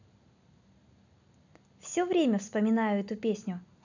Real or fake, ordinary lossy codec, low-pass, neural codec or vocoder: real; none; 7.2 kHz; none